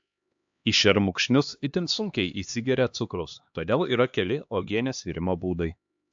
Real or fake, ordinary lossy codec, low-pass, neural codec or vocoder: fake; AAC, 64 kbps; 7.2 kHz; codec, 16 kHz, 2 kbps, X-Codec, HuBERT features, trained on LibriSpeech